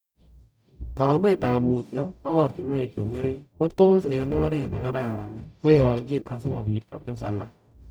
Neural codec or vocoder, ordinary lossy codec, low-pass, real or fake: codec, 44.1 kHz, 0.9 kbps, DAC; none; none; fake